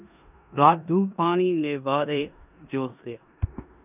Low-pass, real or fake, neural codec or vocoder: 3.6 kHz; fake; codec, 16 kHz in and 24 kHz out, 0.9 kbps, LongCat-Audio-Codec, four codebook decoder